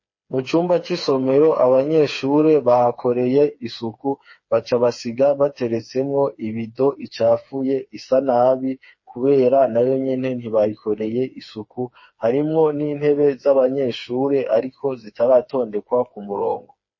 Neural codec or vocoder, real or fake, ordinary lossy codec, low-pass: codec, 16 kHz, 4 kbps, FreqCodec, smaller model; fake; MP3, 32 kbps; 7.2 kHz